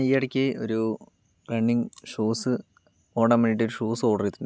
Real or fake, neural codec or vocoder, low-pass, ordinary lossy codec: real; none; none; none